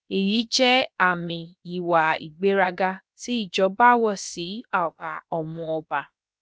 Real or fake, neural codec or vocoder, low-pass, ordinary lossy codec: fake; codec, 16 kHz, about 1 kbps, DyCAST, with the encoder's durations; none; none